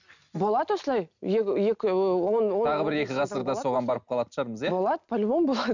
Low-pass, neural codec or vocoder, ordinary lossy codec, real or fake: 7.2 kHz; none; none; real